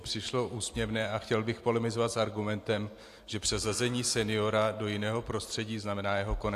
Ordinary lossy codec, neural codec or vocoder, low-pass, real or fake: AAC, 64 kbps; none; 14.4 kHz; real